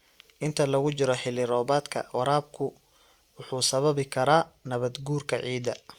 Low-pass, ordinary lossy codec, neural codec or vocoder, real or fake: 19.8 kHz; Opus, 64 kbps; none; real